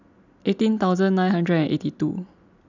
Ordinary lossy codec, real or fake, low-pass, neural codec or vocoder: none; real; 7.2 kHz; none